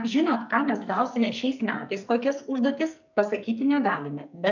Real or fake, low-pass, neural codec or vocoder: fake; 7.2 kHz; codec, 44.1 kHz, 2.6 kbps, SNAC